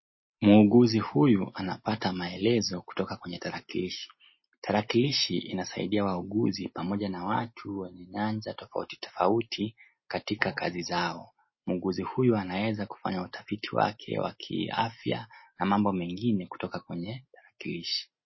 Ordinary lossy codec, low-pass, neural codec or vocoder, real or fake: MP3, 24 kbps; 7.2 kHz; none; real